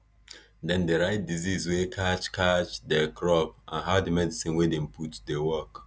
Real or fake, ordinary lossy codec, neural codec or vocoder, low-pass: real; none; none; none